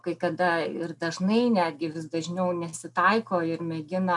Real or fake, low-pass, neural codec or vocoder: real; 10.8 kHz; none